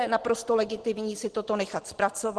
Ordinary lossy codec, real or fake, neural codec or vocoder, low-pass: Opus, 16 kbps; real; none; 10.8 kHz